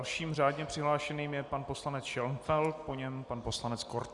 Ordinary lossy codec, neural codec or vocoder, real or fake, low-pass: Opus, 64 kbps; none; real; 10.8 kHz